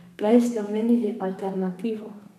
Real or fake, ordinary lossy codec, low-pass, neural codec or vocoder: fake; none; 14.4 kHz; codec, 32 kHz, 1.9 kbps, SNAC